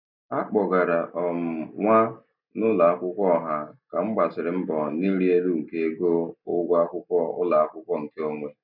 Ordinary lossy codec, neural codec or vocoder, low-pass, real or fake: none; none; 5.4 kHz; real